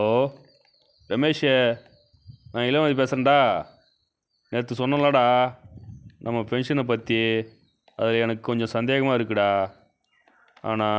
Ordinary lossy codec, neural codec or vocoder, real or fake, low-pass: none; none; real; none